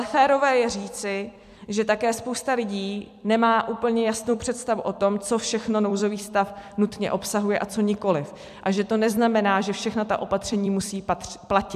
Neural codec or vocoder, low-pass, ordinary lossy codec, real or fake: vocoder, 44.1 kHz, 128 mel bands every 256 samples, BigVGAN v2; 14.4 kHz; MP3, 96 kbps; fake